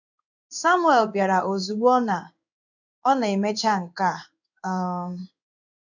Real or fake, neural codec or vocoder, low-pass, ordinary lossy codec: fake; codec, 16 kHz in and 24 kHz out, 1 kbps, XY-Tokenizer; 7.2 kHz; none